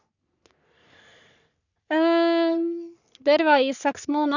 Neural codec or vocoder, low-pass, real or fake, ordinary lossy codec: codec, 44.1 kHz, 3.4 kbps, Pupu-Codec; 7.2 kHz; fake; none